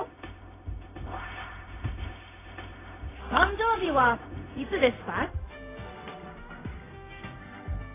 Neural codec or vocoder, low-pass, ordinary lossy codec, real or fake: codec, 16 kHz, 0.4 kbps, LongCat-Audio-Codec; 3.6 kHz; AAC, 16 kbps; fake